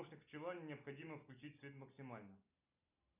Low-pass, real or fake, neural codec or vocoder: 3.6 kHz; real; none